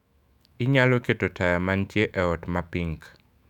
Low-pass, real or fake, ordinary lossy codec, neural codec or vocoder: 19.8 kHz; fake; none; autoencoder, 48 kHz, 128 numbers a frame, DAC-VAE, trained on Japanese speech